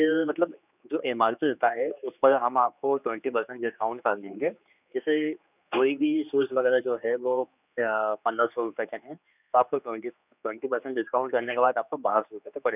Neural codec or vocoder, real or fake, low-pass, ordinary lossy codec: codec, 16 kHz, 2 kbps, X-Codec, HuBERT features, trained on general audio; fake; 3.6 kHz; none